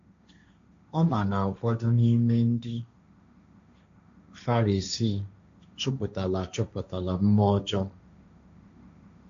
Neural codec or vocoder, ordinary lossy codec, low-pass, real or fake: codec, 16 kHz, 1.1 kbps, Voila-Tokenizer; AAC, 64 kbps; 7.2 kHz; fake